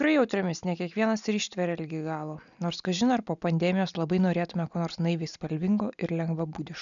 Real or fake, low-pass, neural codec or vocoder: real; 7.2 kHz; none